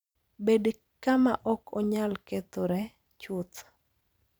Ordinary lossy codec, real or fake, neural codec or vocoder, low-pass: none; fake; vocoder, 44.1 kHz, 128 mel bands every 256 samples, BigVGAN v2; none